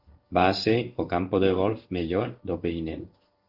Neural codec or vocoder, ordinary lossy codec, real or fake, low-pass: codec, 16 kHz in and 24 kHz out, 1 kbps, XY-Tokenizer; Opus, 32 kbps; fake; 5.4 kHz